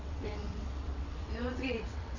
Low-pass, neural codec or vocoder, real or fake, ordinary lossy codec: 7.2 kHz; vocoder, 22.05 kHz, 80 mel bands, WaveNeXt; fake; none